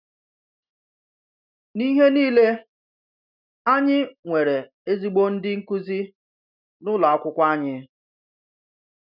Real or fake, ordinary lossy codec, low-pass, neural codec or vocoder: real; none; 5.4 kHz; none